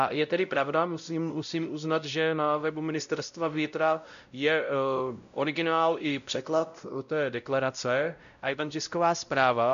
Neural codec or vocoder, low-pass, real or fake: codec, 16 kHz, 0.5 kbps, X-Codec, WavLM features, trained on Multilingual LibriSpeech; 7.2 kHz; fake